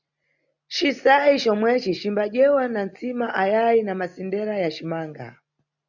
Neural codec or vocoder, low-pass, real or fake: none; 7.2 kHz; real